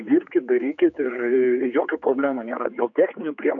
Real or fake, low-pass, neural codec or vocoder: fake; 7.2 kHz; codec, 16 kHz, 4 kbps, X-Codec, HuBERT features, trained on general audio